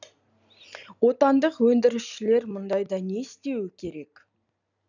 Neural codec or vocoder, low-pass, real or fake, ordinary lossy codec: none; 7.2 kHz; real; none